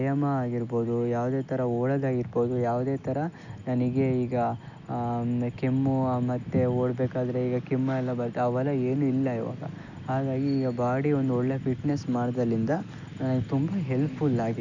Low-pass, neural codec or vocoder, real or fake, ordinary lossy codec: 7.2 kHz; none; real; none